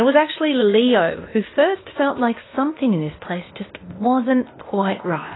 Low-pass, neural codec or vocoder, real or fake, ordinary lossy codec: 7.2 kHz; codec, 16 kHz, 0.8 kbps, ZipCodec; fake; AAC, 16 kbps